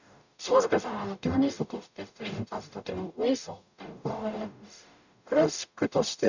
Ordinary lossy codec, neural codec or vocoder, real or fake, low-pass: none; codec, 44.1 kHz, 0.9 kbps, DAC; fake; 7.2 kHz